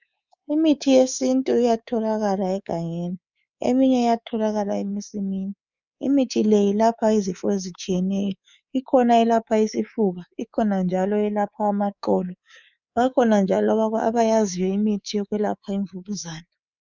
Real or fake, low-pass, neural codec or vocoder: fake; 7.2 kHz; codec, 24 kHz, 3.1 kbps, DualCodec